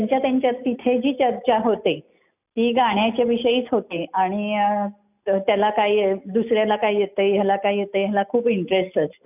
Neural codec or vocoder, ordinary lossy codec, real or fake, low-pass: none; none; real; 3.6 kHz